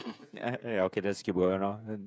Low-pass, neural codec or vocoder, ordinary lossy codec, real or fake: none; codec, 16 kHz, 16 kbps, FreqCodec, smaller model; none; fake